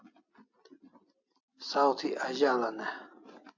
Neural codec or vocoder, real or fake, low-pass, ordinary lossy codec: none; real; 7.2 kHz; AAC, 48 kbps